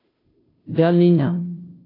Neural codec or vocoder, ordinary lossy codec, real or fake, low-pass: codec, 16 kHz, 0.5 kbps, FunCodec, trained on Chinese and English, 25 frames a second; AAC, 24 kbps; fake; 5.4 kHz